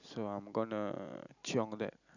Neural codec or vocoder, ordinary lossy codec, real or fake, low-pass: none; none; real; 7.2 kHz